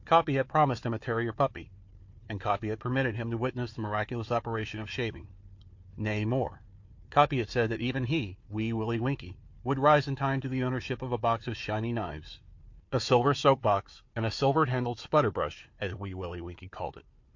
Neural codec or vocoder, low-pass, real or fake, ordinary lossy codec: codec, 16 kHz, 4 kbps, FunCodec, trained on Chinese and English, 50 frames a second; 7.2 kHz; fake; MP3, 48 kbps